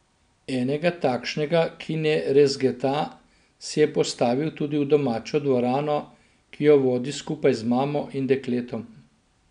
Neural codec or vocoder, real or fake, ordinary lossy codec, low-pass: none; real; none; 9.9 kHz